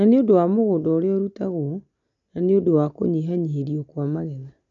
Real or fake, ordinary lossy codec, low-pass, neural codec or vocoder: real; none; 7.2 kHz; none